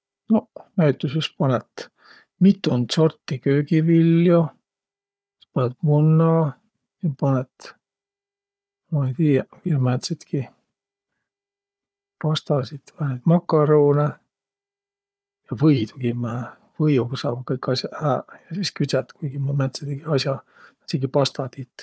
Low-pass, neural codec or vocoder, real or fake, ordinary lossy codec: none; codec, 16 kHz, 4 kbps, FunCodec, trained on Chinese and English, 50 frames a second; fake; none